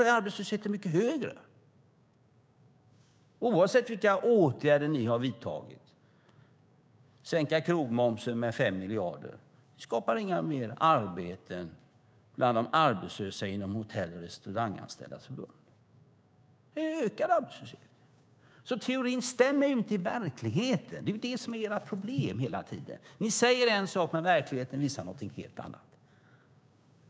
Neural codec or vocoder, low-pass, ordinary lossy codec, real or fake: codec, 16 kHz, 6 kbps, DAC; none; none; fake